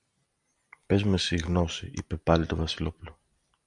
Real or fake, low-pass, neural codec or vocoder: real; 10.8 kHz; none